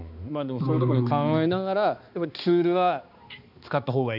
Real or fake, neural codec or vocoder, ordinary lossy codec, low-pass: fake; codec, 16 kHz, 2 kbps, X-Codec, HuBERT features, trained on balanced general audio; none; 5.4 kHz